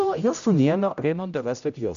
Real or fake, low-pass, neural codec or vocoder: fake; 7.2 kHz; codec, 16 kHz, 0.5 kbps, X-Codec, HuBERT features, trained on general audio